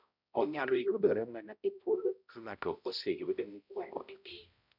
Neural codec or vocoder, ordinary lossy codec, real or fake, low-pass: codec, 16 kHz, 0.5 kbps, X-Codec, HuBERT features, trained on balanced general audio; none; fake; 5.4 kHz